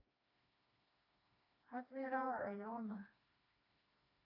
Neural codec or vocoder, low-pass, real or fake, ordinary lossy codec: codec, 16 kHz, 2 kbps, FreqCodec, smaller model; 5.4 kHz; fake; AAC, 24 kbps